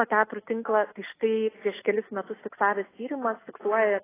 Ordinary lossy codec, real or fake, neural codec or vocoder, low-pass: AAC, 16 kbps; real; none; 3.6 kHz